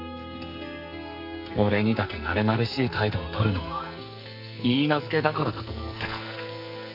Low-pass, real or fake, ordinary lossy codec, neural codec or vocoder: 5.4 kHz; fake; none; codec, 44.1 kHz, 2.6 kbps, SNAC